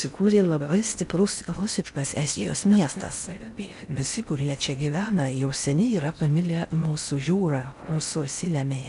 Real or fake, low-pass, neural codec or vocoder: fake; 10.8 kHz; codec, 16 kHz in and 24 kHz out, 0.6 kbps, FocalCodec, streaming, 4096 codes